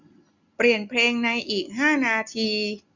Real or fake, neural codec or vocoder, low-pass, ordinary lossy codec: real; none; 7.2 kHz; none